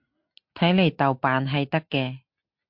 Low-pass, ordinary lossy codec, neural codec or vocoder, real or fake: 5.4 kHz; MP3, 48 kbps; none; real